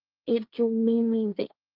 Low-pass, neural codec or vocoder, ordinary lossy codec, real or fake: 5.4 kHz; codec, 16 kHz, 1.1 kbps, Voila-Tokenizer; Opus, 32 kbps; fake